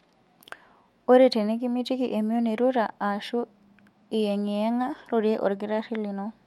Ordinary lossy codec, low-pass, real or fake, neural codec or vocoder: MP3, 64 kbps; 19.8 kHz; fake; autoencoder, 48 kHz, 128 numbers a frame, DAC-VAE, trained on Japanese speech